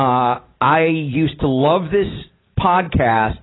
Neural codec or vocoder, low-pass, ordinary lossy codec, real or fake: none; 7.2 kHz; AAC, 16 kbps; real